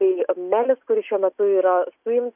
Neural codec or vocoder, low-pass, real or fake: none; 3.6 kHz; real